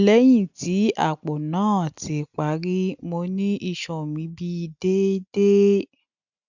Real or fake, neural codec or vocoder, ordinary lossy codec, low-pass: real; none; none; 7.2 kHz